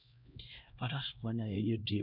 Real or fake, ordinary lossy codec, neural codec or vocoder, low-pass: fake; none; codec, 16 kHz, 1 kbps, X-Codec, HuBERT features, trained on LibriSpeech; 5.4 kHz